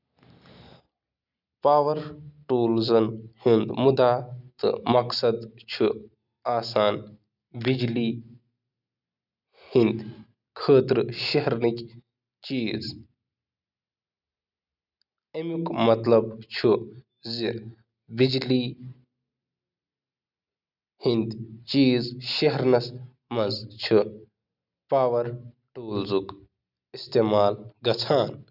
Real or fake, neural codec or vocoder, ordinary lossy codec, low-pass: real; none; none; 5.4 kHz